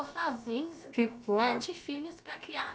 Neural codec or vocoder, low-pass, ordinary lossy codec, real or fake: codec, 16 kHz, about 1 kbps, DyCAST, with the encoder's durations; none; none; fake